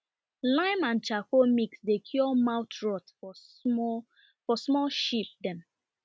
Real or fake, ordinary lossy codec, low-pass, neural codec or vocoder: real; none; none; none